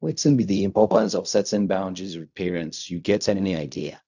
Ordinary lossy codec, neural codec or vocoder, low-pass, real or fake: none; codec, 16 kHz in and 24 kHz out, 0.4 kbps, LongCat-Audio-Codec, fine tuned four codebook decoder; 7.2 kHz; fake